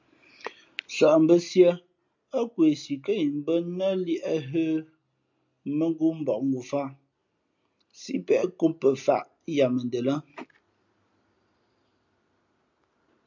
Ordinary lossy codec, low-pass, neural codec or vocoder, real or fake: MP3, 64 kbps; 7.2 kHz; none; real